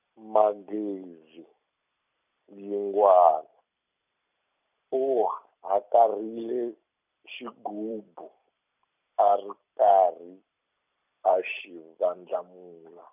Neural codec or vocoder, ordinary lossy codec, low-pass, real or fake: vocoder, 44.1 kHz, 128 mel bands every 256 samples, BigVGAN v2; none; 3.6 kHz; fake